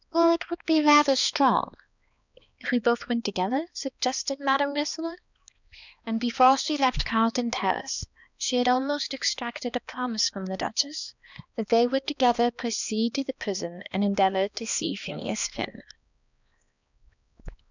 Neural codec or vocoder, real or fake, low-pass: codec, 16 kHz, 2 kbps, X-Codec, HuBERT features, trained on balanced general audio; fake; 7.2 kHz